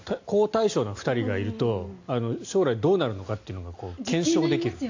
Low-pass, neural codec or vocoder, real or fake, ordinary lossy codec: 7.2 kHz; none; real; AAC, 48 kbps